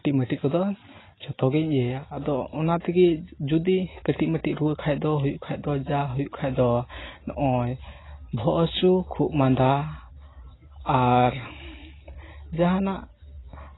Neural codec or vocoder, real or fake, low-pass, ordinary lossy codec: none; real; 7.2 kHz; AAC, 16 kbps